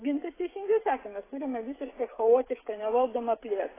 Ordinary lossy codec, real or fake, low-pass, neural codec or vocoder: AAC, 16 kbps; fake; 3.6 kHz; codec, 16 kHz, 16 kbps, FreqCodec, smaller model